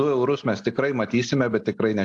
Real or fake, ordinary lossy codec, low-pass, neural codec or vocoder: real; AAC, 64 kbps; 10.8 kHz; none